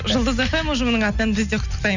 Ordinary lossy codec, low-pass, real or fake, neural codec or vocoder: none; 7.2 kHz; real; none